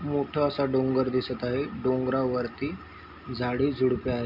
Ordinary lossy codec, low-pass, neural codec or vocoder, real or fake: none; 5.4 kHz; none; real